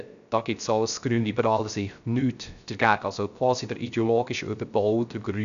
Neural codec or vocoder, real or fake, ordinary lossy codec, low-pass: codec, 16 kHz, 0.3 kbps, FocalCodec; fake; none; 7.2 kHz